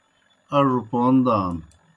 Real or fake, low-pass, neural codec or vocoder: real; 10.8 kHz; none